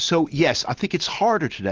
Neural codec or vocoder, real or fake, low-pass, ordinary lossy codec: none; real; 7.2 kHz; Opus, 24 kbps